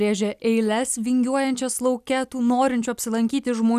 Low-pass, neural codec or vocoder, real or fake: 14.4 kHz; none; real